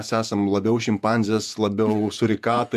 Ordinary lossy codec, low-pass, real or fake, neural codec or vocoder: MP3, 96 kbps; 14.4 kHz; fake; vocoder, 48 kHz, 128 mel bands, Vocos